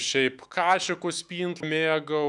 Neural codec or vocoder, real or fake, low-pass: autoencoder, 48 kHz, 128 numbers a frame, DAC-VAE, trained on Japanese speech; fake; 10.8 kHz